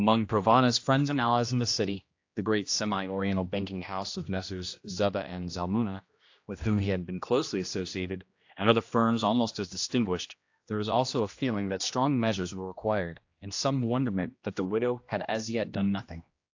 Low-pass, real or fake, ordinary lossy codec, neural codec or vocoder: 7.2 kHz; fake; AAC, 48 kbps; codec, 16 kHz, 1 kbps, X-Codec, HuBERT features, trained on general audio